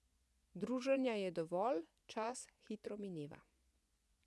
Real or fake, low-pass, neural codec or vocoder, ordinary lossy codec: fake; none; vocoder, 24 kHz, 100 mel bands, Vocos; none